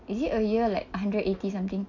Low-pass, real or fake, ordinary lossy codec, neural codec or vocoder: 7.2 kHz; real; none; none